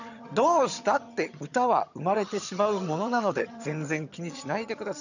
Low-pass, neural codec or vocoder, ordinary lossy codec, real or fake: 7.2 kHz; vocoder, 22.05 kHz, 80 mel bands, HiFi-GAN; none; fake